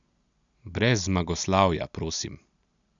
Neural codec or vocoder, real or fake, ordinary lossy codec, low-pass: none; real; none; 7.2 kHz